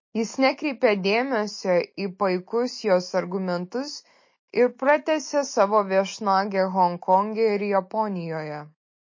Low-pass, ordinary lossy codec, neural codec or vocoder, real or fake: 7.2 kHz; MP3, 32 kbps; none; real